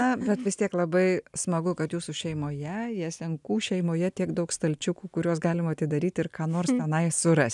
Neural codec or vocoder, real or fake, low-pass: none; real; 10.8 kHz